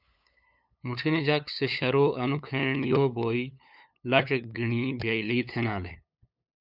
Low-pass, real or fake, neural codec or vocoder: 5.4 kHz; fake; codec, 16 kHz, 8 kbps, FunCodec, trained on LibriTTS, 25 frames a second